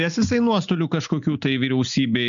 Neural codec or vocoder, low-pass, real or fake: none; 7.2 kHz; real